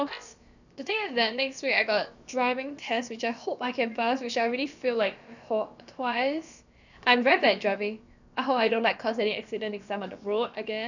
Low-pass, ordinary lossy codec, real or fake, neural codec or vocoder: 7.2 kHz; none; fake; codec, 16 kHz, about 1 kbps, DyCAST, with the encoder's durations